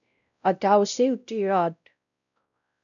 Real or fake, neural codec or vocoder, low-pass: fake; codec, 16 kHz, 0.5 kbps, X-Codec, WavLM features, trained on Multilingual LibriSpeech; 7.2 kHz